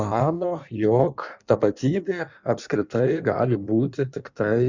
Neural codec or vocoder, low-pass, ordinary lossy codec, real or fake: codec, 16 kHz in and 24 kHz out, 1.1 kbps, FireRedTTS-2 codec; 7.2 kHz; Opus, 64 kbps; fake